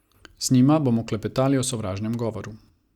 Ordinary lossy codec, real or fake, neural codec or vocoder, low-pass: Opus, 64 kbps; real; none; 19.8 kHz